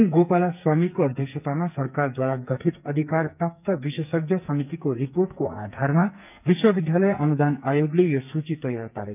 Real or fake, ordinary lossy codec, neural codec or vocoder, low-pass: fake; none; codec, 44.1 kHz, 2.6 kbps, SNAC; 3.6 kHz